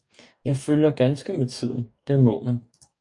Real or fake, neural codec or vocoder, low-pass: fake; codec, 44.1 kHz, 2.6 kbps, DAC; 10.8 kHz